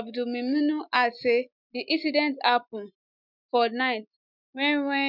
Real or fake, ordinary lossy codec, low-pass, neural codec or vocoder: real; none; 5.4 kHz; none